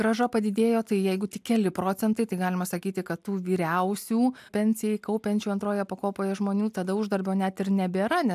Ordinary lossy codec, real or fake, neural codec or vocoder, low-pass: AAC, 96 kbps; real; none; 14.4 kHz